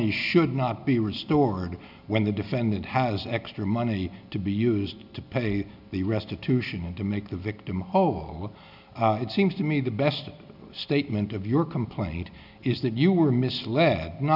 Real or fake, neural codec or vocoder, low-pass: real; none; 5.4 kHz